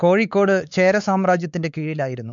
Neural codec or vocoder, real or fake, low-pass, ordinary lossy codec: codec, 16 kHz, 4 kbps, X-Codec, WavLM features, trained on Multilingual LibriSpeech; fake; 7.2 kHz; none